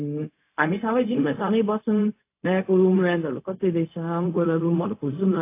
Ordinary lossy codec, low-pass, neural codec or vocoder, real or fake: AAC, 24 kbps; 3.6 kHz; codec, 16 kHz, 0.4 kbps, LongCat-Audio-Codec; fake